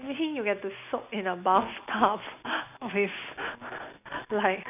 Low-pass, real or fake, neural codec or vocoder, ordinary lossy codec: 3.6 kHz; real; none; none